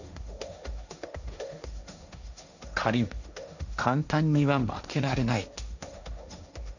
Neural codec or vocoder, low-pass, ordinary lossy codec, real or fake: codec, 16 kHz, 1.1 kbps, Voila-Tokenizer; 7.2 kHz; none; fake